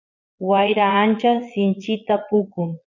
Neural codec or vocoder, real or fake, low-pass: vocoder, 22.05 kHz, 80 mel bands, Vocos; fake; 7.2 kHz